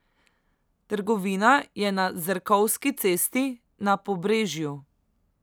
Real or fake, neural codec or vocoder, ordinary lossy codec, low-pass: real; none; none; none